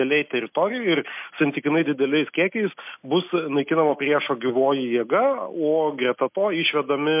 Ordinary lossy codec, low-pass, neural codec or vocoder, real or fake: MP3, 32 kbps; 3.6 kHz; none; real